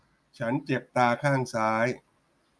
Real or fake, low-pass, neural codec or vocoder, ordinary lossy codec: real; none; none; none